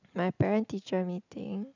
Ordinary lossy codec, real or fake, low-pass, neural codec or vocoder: none; real; 7.2 kHz; none